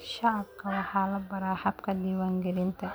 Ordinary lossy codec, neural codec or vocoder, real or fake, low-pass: none; none; real; none